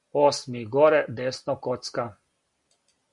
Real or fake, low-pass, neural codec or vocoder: real; 10.8 kHz; none